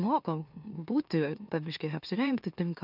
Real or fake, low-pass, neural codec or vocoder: fake; 5.4 kHz; autoencoder, 44.1 kHz, a latent of 192 numbers a frame, MeloTTS